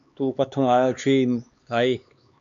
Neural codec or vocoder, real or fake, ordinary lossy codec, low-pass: codec, 16 kHz, 2 kbps, X-Codec, HuBERT features, trained on LibriSpeech; fake; MP3, 96 kbps; 7.2 kHz